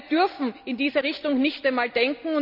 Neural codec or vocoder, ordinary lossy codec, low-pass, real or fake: none; none; 5.4 kHz; real